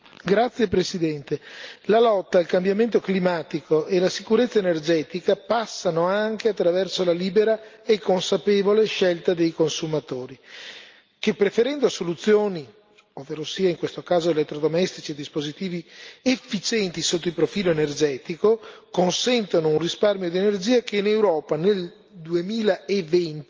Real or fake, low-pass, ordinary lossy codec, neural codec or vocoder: real; 7.2 kHz; Opus, 24 kbps; none